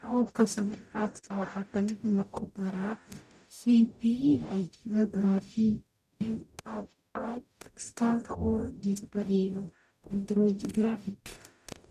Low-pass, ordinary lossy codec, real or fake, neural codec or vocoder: 14.4 kHz; none; fake; codec, 44.1 kHz, 0.9 kbps, DAC